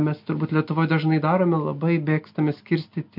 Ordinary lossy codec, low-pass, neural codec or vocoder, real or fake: MP3, 48 kbps; 5.4 kHz; none; real